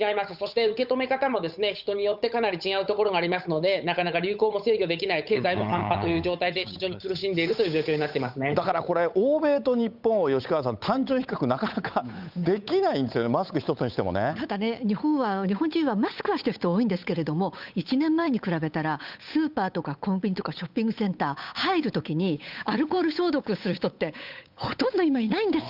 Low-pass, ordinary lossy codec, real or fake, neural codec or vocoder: 5.4 kHz; none; fake; codec, 16 kHz, 8 kbps, FunCodec, trained on Chinese and English, 25 frames a second